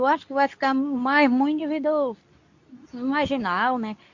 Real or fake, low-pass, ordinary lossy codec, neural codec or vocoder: fake; 7.2 kHz; AAC, 48 kbps; codec, 24 kHz, 0.9 kbps, WavTokenizer, medium speech release version 2